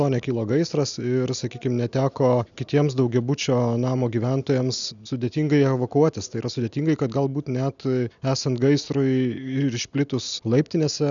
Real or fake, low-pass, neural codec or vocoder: real; 7.2 kHz; none